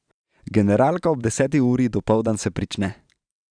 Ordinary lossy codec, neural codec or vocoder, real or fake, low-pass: none; vocoder, 44.1 kHz, 128 mel bands every 512 samples, BigVGAN v2; fake; 9.9 kHz